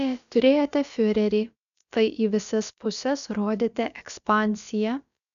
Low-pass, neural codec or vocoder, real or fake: 7.2 kHz; codec, 16 kHz, about 1 kbps, DyCAST, with the encoder's durations; fake